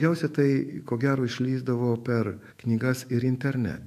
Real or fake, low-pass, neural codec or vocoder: fake; 14.4 kHz; autoencoder, 48 kHz, 128 numbers a frame, DAC-VAE, trained on Japanese speech